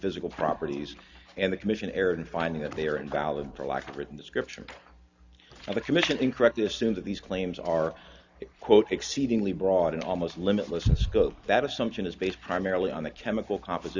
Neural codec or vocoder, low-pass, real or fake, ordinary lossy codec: none; 7.2 kHz; real; Opus, 64 kbps